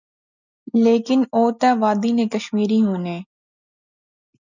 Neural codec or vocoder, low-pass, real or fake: none; 7.2 kHz; real